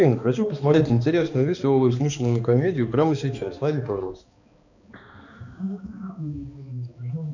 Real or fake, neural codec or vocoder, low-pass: fake; codec, 16 kHz, 2 kbps, X-Codec, HuBERT features, trained on balanced general audio; 7.2 kHz